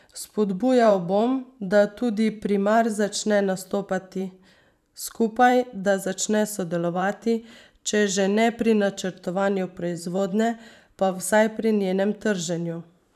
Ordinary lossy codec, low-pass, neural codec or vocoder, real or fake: none; 14.4 kHz; vocoder, 44.1 kHz, 128 mel bands every 512 samples, BigVGAN v2; fake